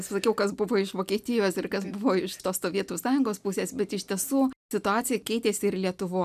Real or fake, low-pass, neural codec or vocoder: real; 14.4 kHz; none